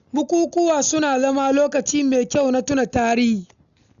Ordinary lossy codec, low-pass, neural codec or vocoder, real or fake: none; 7.2 kHz; none; real